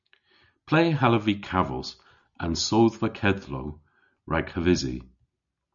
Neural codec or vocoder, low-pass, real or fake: none; 7.2 kHz; real